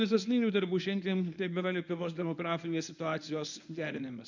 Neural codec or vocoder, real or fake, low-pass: codec, 24 kHz, 0.9 kbps, WavTokenizer, medium speech release version 1; fake; 7.2 kHz